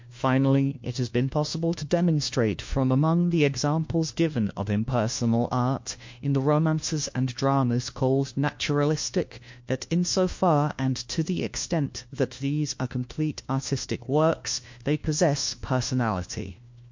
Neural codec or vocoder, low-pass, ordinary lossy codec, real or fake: codec, 16 kHz, 1 kbps, FunCodec, trained on LibriTTS, 50 frames a second; 7.2 kHz; MP3, 48 kbps; fake